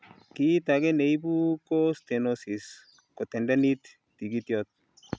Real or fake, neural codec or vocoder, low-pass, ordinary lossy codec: real; none; none; none